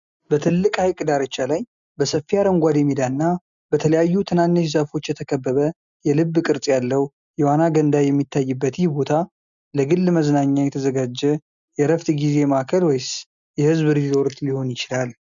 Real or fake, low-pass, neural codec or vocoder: real; 7.2 kHz; none